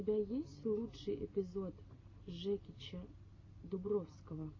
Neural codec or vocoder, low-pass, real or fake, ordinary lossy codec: none; 7.2 kHz; real; AAC, 32 kbps